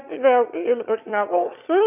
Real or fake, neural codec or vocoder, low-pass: fake; autoencoder, 22.05 kHz, a latent of 192 numbers a frame, VITS, trained on one speaker; 3.6 kHz